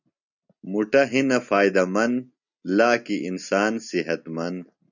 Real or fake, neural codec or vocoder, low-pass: real; none; 7.2 kHz